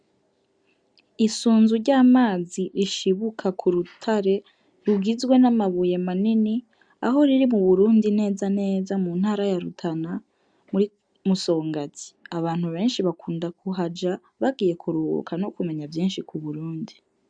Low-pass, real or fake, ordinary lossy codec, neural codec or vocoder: 9.9 kHz; real; MP3, 96 kbps; none